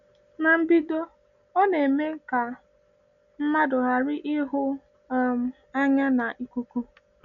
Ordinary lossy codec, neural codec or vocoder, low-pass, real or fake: none; none; 7.2 kHz; real